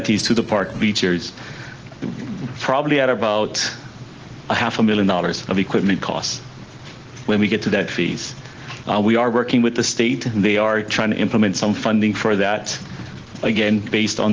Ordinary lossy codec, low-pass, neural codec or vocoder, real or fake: Opus, 16 kbps; 7.2 kHz; none; real